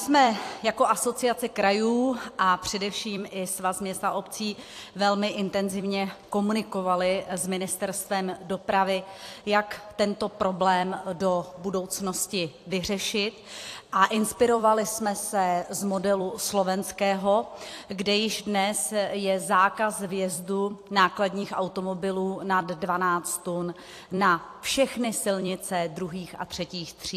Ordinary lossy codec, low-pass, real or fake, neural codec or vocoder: AAC, 64 kbps; 14.4 kHz; fake; vocoder, 44.1 kHz, 128 mel bands every 256 samples, BigVGAN v2